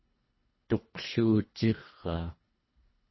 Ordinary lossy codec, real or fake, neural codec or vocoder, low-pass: MP3, 24 kbps; fake; codec, 24 kHz, 1.5 kbps, HILCodec; 7.2 kHz